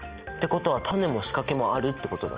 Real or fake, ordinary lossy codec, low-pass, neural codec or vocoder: real; Opus, 24 kbps; 3.6 kHz; none